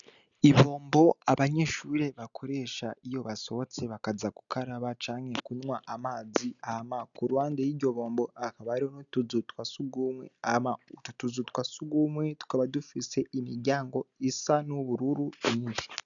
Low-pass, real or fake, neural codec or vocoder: 7.2 kHz; real; none